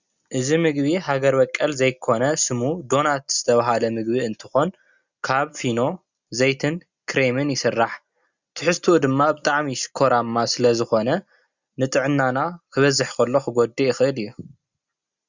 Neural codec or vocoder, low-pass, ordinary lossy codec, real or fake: none; 7.2 kHz; Opus, 64 kbps; real